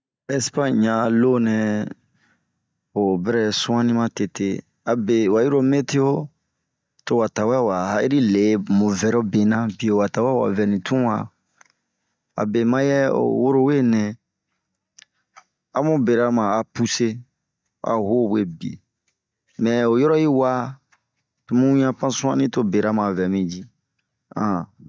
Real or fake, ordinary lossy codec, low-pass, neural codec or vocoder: real; none; none; none